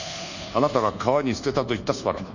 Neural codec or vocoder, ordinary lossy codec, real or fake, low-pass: codec, 24 kHz, 1.2 kbps, DualCodec; none; fake; 7.2 kHz